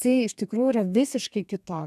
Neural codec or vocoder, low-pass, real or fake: codec, 32 kHz, 1.9 kbps, SNAC; 14.4 kHz; fake